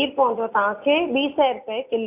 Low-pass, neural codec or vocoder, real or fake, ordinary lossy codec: 3.6 kHz; none; real; none